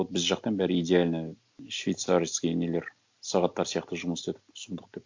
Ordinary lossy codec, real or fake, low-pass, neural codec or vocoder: MP3, 64 kbps; real; 7.2 kHz; none